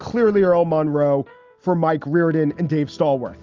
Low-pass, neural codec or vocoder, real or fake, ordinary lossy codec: 7.2 kHz; none; real; Opus, 24 kbps